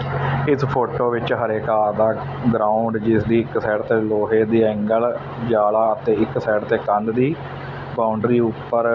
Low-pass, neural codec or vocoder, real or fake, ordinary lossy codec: 7.2 kHz; none; real; none